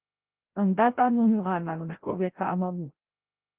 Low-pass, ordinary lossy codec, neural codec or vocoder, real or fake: 3.6 kHz; Opus, 16 kbps; codec, 16 kHz, 0.5 kbps, FreqCodec, larger model; fake